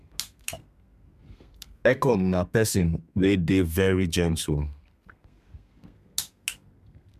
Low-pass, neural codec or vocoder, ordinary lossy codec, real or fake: 14.4 kHz; codec, 32 kHz, 1.9 kbps, SNAC; MP3, 96 kbps; fake